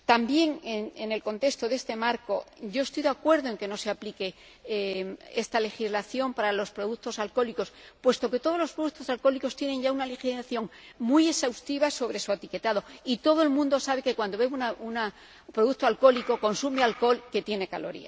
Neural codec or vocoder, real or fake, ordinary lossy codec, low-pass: none; real; none; none